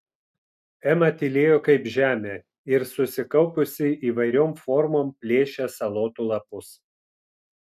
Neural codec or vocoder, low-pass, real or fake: none; 14.4 kHz; real